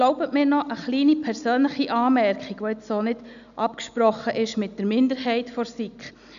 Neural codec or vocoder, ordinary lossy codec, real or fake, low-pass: none; none; real; 7.2 kHz